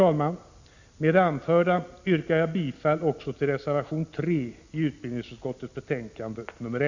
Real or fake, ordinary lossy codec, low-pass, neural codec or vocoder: real; Opus, 64 kbps; 7.2 kHz; none